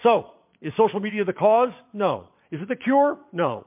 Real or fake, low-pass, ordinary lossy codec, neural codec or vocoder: fake; 3.6 kHz; MP3, 32 kbps; vocoder, 22.05 kHz, 80 mel bands, Vocos